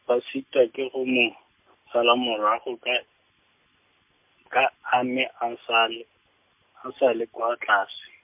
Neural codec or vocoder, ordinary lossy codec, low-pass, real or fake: none; MP3, 32 kbps; 3.6 kHz; real